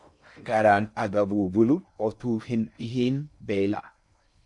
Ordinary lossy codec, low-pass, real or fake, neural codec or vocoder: MP3, 96 kbps; 10.8 kHz; fake; codec, 16 kHz in and 24 kHz out, 0.6 kbps, FocalCodec, streaming, 4096 codes